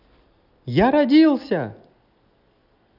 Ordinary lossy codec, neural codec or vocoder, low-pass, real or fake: none; none; 5.4 kHz; real